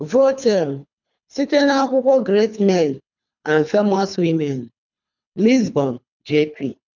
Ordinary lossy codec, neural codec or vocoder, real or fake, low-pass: none; codec, 24 kHz, 3 kbps, HILCodec; fake; 7.2 kHz